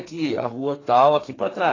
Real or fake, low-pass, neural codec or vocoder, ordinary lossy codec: fake; 7.2 kHz; codec, 32 kHz, 1.9 kbps, SNAC; AAC, 32 kbps